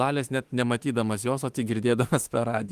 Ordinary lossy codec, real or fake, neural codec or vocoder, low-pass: Opus, 24 kbps; fake; autoencoder, 48 kHz, 32 numbers a frame, DAC-VAE, trained on Japanese speech; 14.4 kHz